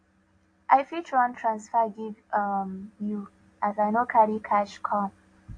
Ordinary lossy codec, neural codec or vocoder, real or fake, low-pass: AAC, 48 kbps; none; real; 9.9 kHz